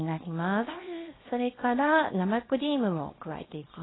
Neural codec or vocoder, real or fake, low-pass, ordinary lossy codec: codec, 24 kHz, 0.9 kbps, WavTokenizer, small release; fake; 7.2 kHz; AAC, 16 kbps